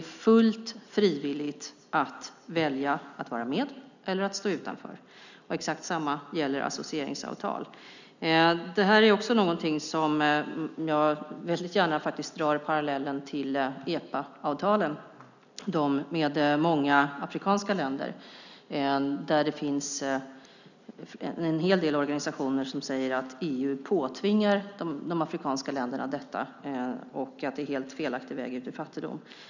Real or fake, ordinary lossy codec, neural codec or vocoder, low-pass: real; none; none; 7.2 kHz